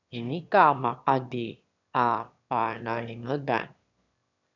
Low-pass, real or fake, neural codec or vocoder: 7.2 kHz; fake; autoencoder, 22.05 kHz, a latent of 192 numbers a frame, VITS, trained on one speaker